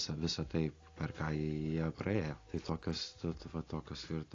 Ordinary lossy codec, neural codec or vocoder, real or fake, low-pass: AAC, 32 kbps; none; real; 7.2 kHz